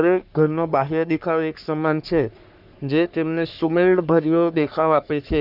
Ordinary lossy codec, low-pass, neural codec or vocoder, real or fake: none; 5.4 kHz; codec, 44.1 kHz, 3.4 kbps, Pupu-Codec; fake